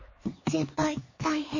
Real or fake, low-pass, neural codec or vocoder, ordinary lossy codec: fake; 7.2 kHz; codec, 16 kHz, 4 kbps, X-Codec, HuBERT features, trained on LibriSpeech; MP3, 32 kbps